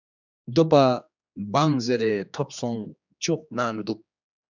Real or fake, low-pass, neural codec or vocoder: fake; 7.2 kHz; codec, 16 kHz, 2 kbps, X-Codec, HuBERT features, trained on general audio